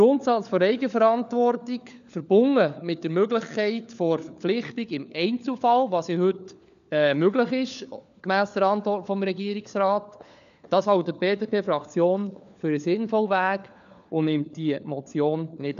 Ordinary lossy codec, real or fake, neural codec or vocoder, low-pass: none; fake; codec, 16 kHz, 4 kbps, FunCodec, trained on LibriTTS, 50 frames a second; 7.2 kHz